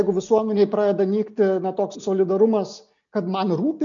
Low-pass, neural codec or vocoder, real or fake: 7.2 kHz; none; real